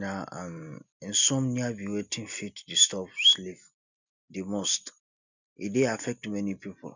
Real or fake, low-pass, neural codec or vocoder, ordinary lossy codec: real; 7.2 kHz; none; none